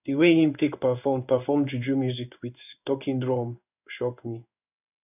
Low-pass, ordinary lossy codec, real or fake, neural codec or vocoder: 3.6 kHz; none; fake; codec, 16 kHz in and 24 kHz out, 1 kbps, XY-Tokenizer